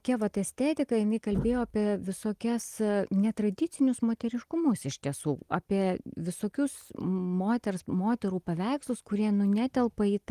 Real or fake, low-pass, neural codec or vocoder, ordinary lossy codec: real; 14.4 kHz; none; Opus, 24 kbps